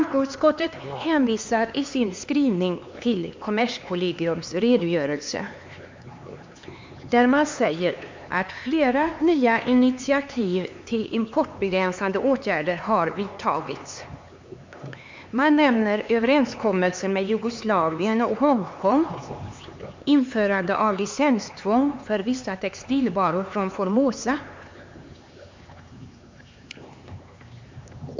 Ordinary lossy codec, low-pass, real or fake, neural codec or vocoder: MP3, 48 kbps; 7.2 kHz; fake; codec, 16 kHz, 4 kbps, X-Codec, HuBERT features, trained on LibriSpeech